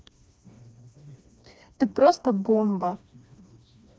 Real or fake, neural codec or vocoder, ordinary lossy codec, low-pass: fake; codec, 16 kHz, 2 kbps, FreqCodec, smaller model; none; none